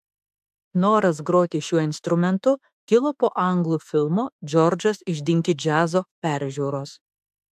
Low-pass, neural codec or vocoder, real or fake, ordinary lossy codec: 14.4 kHz; autoencoder, 48 kHz, 32 numbers a frame, DAC-VAE, trained on Japanese speech; fake; AAC, 96 kbps